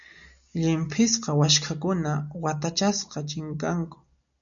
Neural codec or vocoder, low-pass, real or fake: none; 7.2 kHz; real